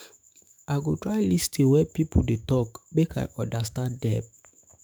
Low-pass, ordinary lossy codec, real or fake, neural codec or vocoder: none; none; fake; autoencoder, 48 kHz, 128 numbers a frame, DAC-VAE, trained on Japanese speech